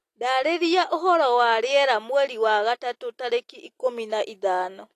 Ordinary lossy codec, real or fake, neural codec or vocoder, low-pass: AAC, 48 kbps; real; none; 14.4 kHz